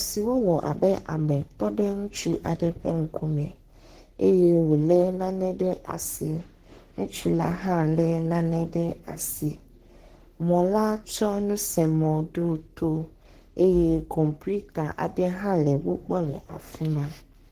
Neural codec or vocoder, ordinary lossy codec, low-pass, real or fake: codec, 44.1 kHz, 3.4 kbps, Pupu-Codec; Opus, 16 kbps; 14.4 kHz; fake